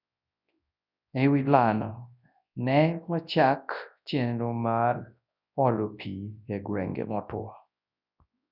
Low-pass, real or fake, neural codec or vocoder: 5.4 kHz; fake; codec, 24 kHz, 0.9 kbps, WavTokenizer, large speech release